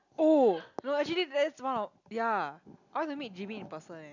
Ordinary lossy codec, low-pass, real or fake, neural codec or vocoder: none; 7.2 kHz; real; none